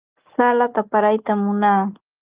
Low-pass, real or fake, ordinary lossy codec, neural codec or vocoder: 3.6 kHz; real; Opus, 24 kbps; none